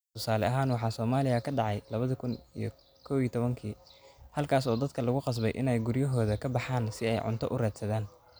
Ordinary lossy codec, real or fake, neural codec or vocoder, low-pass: none; real; none; none